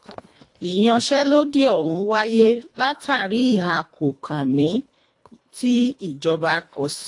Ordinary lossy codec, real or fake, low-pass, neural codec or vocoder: AAC, 48 kbps; fake; 10.8 kHz; codec, 24 kHz, 1.5 kbps, HILCodec